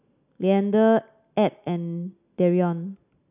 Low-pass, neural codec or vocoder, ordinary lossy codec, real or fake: 3.6 kHz; none; none; real